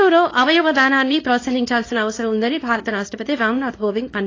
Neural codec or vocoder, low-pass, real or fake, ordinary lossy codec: codec, 24 kHz, 0.9 kbps, WavTokenizer, small release; 7.2 kHz; fake; AAC, 32 kbps